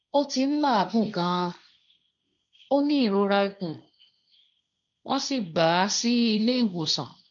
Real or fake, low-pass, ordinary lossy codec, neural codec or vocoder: fake; 7.2 kHz; none; codec, 16 kHz, 1.1 kbps, Voila-Tokenizer